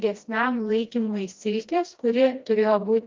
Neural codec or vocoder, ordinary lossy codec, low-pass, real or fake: codec, 16 kHz, 1 kbps, FreqCodec, smaller model; Opus, 32 kbps; 7.2 kHz; fake